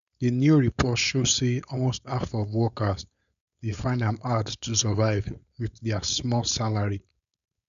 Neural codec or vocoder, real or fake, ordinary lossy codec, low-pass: codec, 16 kHz, 4.8 kbps, FACodec; fake; none; 7.2 kHz